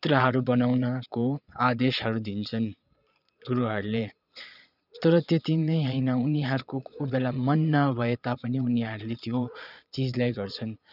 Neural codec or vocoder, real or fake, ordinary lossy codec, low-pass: vocoder, 22.05 kHz, 80 mel bands, Vocos; fake; none; 5.4 kHz